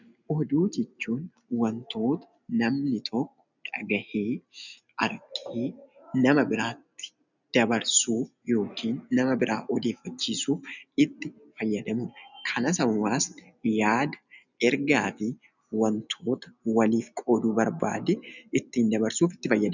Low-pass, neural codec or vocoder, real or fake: 7.2 kHz; none; real